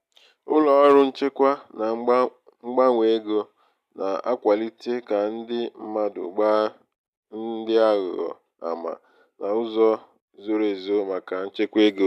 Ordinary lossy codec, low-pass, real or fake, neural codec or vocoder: none; 14.4 kHz; real; none